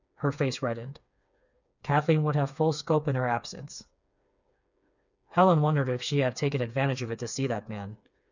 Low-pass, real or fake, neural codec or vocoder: 7.2 kHz; fake; codec, 16 kHz, 4 kbps, FreqCodec, smaller model